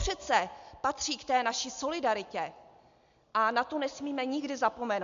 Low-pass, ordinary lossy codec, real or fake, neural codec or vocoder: 7.2 kHz; MP3, 64 kbps; real; none